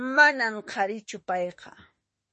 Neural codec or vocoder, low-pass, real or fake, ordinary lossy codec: autoencoder, 48 kHz, 32 numbers a frame, DAC-VAE, trained on Japanese speech; 10.8 kHz; fake; MP3, 32 kbps